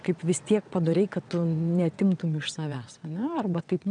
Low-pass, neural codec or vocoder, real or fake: 9.9 kHz; vocoder, 22.05 kHz, 80 mel bands, WaveNeXt; fake